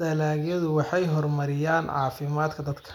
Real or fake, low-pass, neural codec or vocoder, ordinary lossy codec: real; 19.8 kHz; none; none